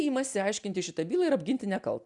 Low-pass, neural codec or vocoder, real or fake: 10.8 kHz; none; real